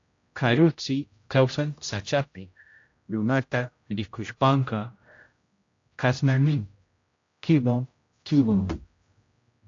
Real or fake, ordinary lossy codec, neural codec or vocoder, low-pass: fake; AAC, 48 kbps; codec, 16 kHz, 0.5 kbps, X-Codec, HuBERT features, trained on general audio; 7.2 kHz